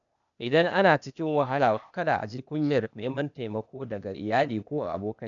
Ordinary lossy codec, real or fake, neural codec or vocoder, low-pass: none; fake; codec, 16 kHz, 0.8 kbps, ZipCodec; 7.2 kHz